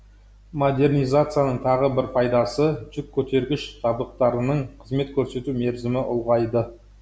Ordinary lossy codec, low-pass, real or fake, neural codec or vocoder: none; none; real; none